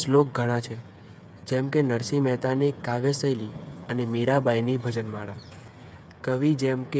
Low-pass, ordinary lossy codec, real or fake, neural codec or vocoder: none; none; fake; codec, 16 kHz, 8 kbps, FreqCodec, smaller model